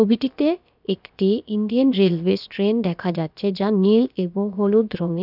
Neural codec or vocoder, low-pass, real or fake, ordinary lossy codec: codec, 16 kHz, about 1 kbps, DyCAST, with the encoder's durations; 5.4 kHz; fake; none